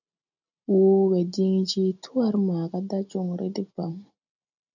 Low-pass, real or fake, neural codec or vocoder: 7.2 kHz; real; none